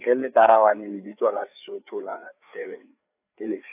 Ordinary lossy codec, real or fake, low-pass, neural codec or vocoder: AAC, 32 kbps; fake; 3.6 kHz; codec, 16 kHz, 4 kbps, FreqCodec, larger model